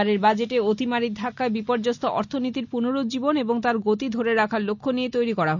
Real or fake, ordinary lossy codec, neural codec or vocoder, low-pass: real; none; none; 7.2 kHz